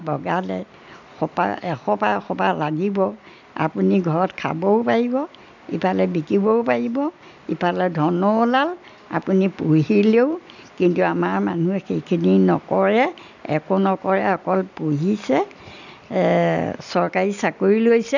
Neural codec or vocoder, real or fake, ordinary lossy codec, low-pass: none; real; none; 7.2 kHz